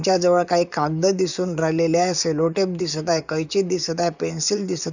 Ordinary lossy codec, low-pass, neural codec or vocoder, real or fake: none; 7.2 kHz; vocoder, 44.1 kHz, 128 mel bands, Pupu-Vocoder; fake